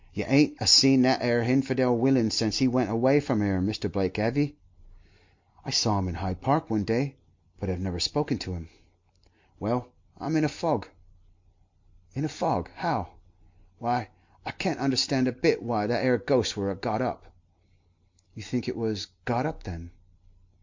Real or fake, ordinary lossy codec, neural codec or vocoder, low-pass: real; MP3, 48 kbps; none; 7.2 kHz